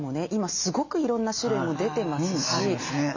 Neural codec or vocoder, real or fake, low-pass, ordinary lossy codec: none; real; 7.2 kHz; none